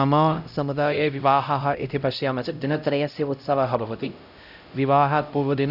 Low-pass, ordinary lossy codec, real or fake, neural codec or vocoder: 5.4 kHz; none; fake; codec, 16 kHz, 0.5 kbps, X-Codec, HuBERT features, trained on LibriSpeech